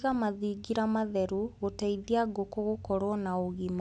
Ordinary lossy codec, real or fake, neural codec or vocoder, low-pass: none; real; none; none